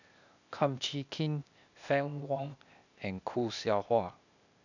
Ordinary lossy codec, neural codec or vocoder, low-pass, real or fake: none; codec, 16 kHz, 0.8 kbps, ZipCodec; 7.2 kHz; fake